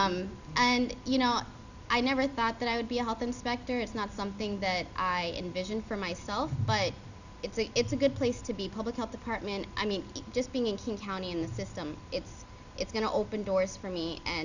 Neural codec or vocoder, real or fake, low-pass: none; real; 7.2 kHz